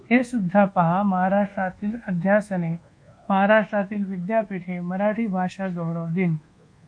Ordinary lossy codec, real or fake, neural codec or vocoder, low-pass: MP3, 48 kbps; fake; codec, 24 kHz, 1.2 kbps, DualCodec; 9.9 kHz